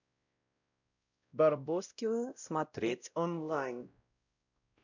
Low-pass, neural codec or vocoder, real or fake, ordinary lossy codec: 7.2 kHz; codec, 16 kHz, 0.5 kbps, X-Codec, WavLM features, trained on Multilingual LibriSpeech; fake; none